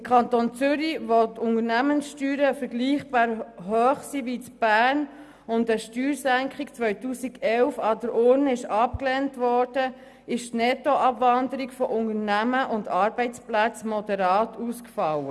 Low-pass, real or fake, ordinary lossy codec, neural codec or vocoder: none; real; none; none